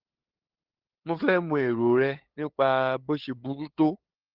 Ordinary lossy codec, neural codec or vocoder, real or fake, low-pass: Opus, 16 kbps; codec, 16 kHz, 8 kbps, FunCodec, trained on LibriTTS, 25 frames a second; fake; 5.4 kHz